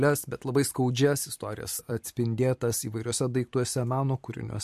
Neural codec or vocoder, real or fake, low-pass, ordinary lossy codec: vocoder, 44.1 kHz, 128 mel bands every 256 samples, BigVGAN v2; fake; 14.4 kHz; MP3, 64 kbps